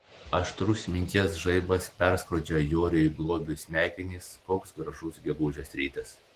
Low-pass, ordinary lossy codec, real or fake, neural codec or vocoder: 14.4 kHz; Opus, 16 kbps; fake; autoencoder, 48 kHz, 128 numbers a frame, DAC-VAE, trained on Japanese speech